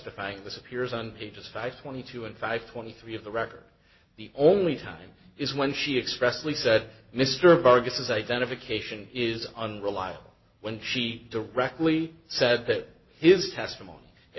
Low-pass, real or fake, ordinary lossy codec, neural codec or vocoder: 7.2 kHz; real; MP3, 24 kbps; none